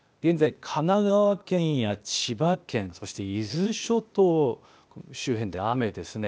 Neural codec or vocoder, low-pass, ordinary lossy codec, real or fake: codec, 16 kHz, 0.8 kbps, ZipCodec; none; none; fake